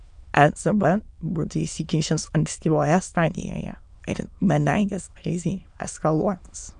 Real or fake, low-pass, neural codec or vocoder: fake; 9.9 kHz; autoencoder, 22.05 kHz, a latent of 192 numbers a frame, VITS, trained on many speakers